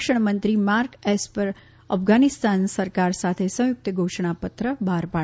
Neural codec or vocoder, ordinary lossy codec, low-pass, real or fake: none; none; none; real